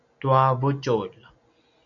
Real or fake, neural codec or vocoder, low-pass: real; none; 7.2 kHz